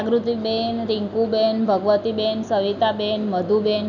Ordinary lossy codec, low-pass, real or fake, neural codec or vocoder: none; 7.2 kHz; real; none